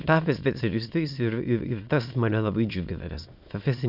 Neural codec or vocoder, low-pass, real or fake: autoencoder, 22.05 kHz, a latent of 192 numbers a frame, VITS, trained on many speakers; 5.4 kHz; fake